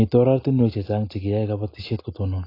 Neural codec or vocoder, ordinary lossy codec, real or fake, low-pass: none; AAC, 24 kbps; real; 5.4 kHz